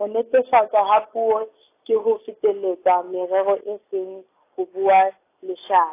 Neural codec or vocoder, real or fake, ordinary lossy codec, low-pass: none; real; AAC, 24 kbps; 3.6 kHz